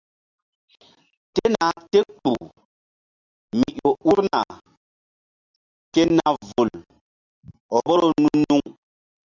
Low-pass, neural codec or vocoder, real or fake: 7.2 kHz; none; real